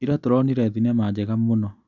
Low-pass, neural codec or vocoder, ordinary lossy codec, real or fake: 7.2 kHz; none; none; real